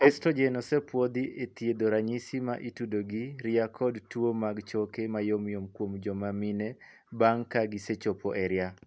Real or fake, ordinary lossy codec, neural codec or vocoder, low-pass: real; none; none; none